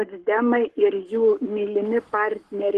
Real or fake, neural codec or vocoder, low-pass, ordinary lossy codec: fake; codec, 44.1 kHz, 7.8 kbps, Pupu-Codec; 14.4 kHz; Opus, 16 kbps